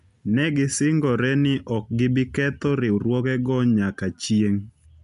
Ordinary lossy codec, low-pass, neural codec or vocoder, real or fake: MP3, 64 kbps; 10.8 kHz; none; real